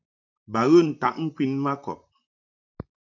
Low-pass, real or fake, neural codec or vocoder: 7.2 kHz; fake; vocoder, 44.1 kHz, 128 mel bands, Pupu-Vocoder